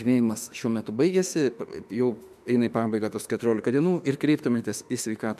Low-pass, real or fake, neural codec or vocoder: 14.4 kHz; fake; autoencoder, 48 kHz, 32 numbers a frame, DAC-VAE, trained on Japanese speech